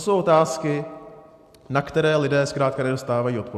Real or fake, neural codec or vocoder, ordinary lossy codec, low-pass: real; none; AAC, 96 kbps; 14.4 kHz